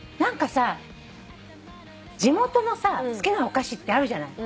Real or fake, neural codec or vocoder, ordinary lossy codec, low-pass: real; none; none; none